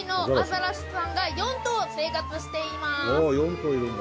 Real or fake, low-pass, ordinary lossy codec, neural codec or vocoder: real; none; none; none